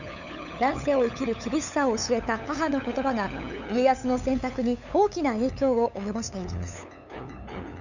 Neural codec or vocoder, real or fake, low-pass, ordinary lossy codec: codec, 16 kHz, 8 kbps, FunCodec, trained on LibriTTS, 25 frames a second; fake; 7.2 kHz; none